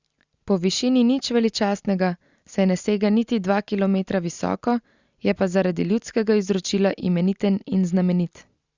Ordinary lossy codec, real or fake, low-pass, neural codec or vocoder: Opus, 64 kbps; real; 7.2 kHz; none